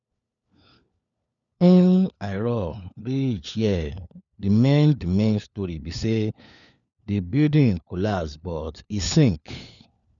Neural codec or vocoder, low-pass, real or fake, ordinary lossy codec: codec, 16 kHz, 4 kbps, FunCodec, trained on LibriTTS, 50 frames a second; 7.2 kHz; fake; none